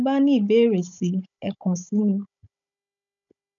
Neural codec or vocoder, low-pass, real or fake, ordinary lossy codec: codec, 16 kHz, 16 kbps, FunCodec, trained on Chinese and English, 50 frames a second; 7.2 kHz; fake; none